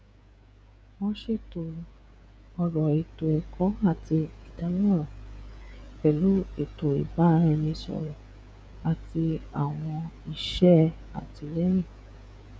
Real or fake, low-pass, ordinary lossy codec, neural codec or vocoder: fake; none; none; codec, 16 kHz, 16 kbps, FreqCodec, smaller model